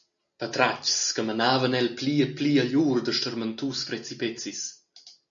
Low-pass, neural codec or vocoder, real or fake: 7.2 kHz; none; real